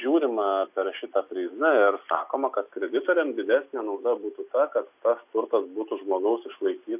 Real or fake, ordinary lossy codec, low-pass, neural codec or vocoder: real; AAC, 32 kbps; 3.6 kHz; none